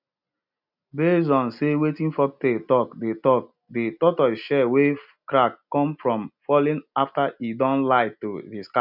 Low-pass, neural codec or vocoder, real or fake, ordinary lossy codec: 5.4 kHz; none; real; none